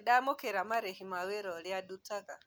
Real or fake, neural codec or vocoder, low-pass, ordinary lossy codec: real; none; none; none